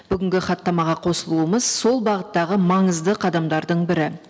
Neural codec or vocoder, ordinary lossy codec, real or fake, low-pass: none; none; real; none